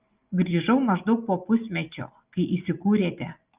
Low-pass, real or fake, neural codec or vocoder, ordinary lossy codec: 3.6 kHz; real; none; Opus, 24 kbps